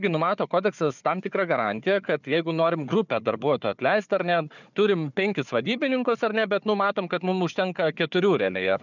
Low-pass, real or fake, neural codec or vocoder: 7.2 kHz; fake; codec, 16 kHz, 4 kbps, FunCodec, trained on Chinese and English, 50 frames a second